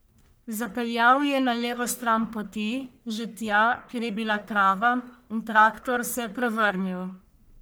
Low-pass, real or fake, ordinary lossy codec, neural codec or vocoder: none; fake; none; codec, 44.1 kHz, 1.7 kbps, Pupu-Codec